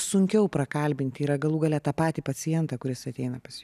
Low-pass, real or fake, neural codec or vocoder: 14.4 kHz; real; none